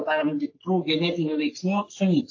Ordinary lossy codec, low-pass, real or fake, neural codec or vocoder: AAC, 48 kbps; 7.2 kHz; fake; codec, 44.1 kHz, 3.4 kbps, Pupu-Codec